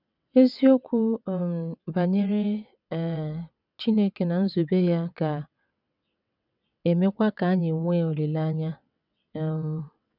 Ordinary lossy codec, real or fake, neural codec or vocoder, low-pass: none; fake; vocoder, 22.05 kHz, 80 mel bands, WaveNeXt; 5.4 kHz